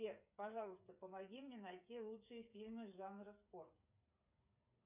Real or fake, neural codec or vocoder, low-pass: fake; codec, 16 kHz, 8 kbps, FreqCodec, smaller model; 3.6 kHz